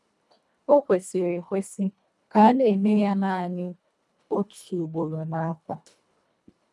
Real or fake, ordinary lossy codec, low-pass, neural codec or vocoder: fake; none; none; codec, 24 kHz, 1.5 kbps, HILCodec